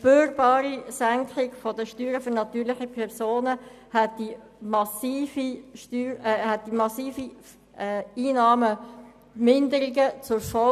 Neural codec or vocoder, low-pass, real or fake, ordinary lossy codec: none; 14.4 kHz; real; none